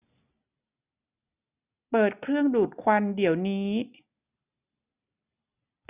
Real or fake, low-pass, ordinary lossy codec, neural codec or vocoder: real; 3.6 kHz; none; none